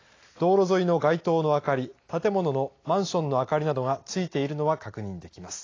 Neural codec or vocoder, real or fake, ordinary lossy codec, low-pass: none; real; AAC, 32 kbps; 7.2 kHz